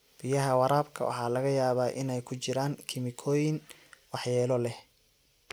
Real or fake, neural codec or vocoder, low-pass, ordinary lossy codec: real; none; none; none